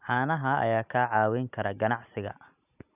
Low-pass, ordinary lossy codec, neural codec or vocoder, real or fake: 3.6 kHz; none; none; real